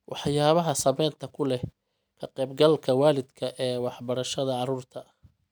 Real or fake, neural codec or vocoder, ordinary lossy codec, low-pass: real; none; none; none